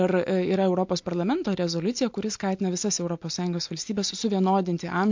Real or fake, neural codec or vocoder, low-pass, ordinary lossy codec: real; none; 7.2 kHz; MP3, 48 kbps